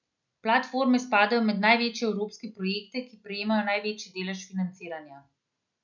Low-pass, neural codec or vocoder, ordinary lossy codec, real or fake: 7.2 kHz; none; none; real